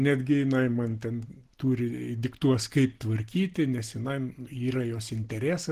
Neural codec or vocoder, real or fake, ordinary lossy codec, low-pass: none; real; Opus, 16 kbps; 14.4 kHz